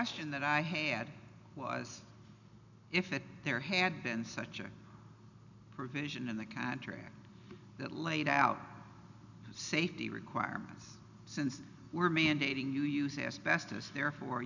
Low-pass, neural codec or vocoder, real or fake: 7.2 kHz; none; real